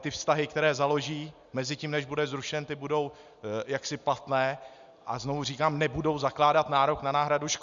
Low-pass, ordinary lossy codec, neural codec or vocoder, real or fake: 7.2 kHz; Opus, 64 kbps; none; real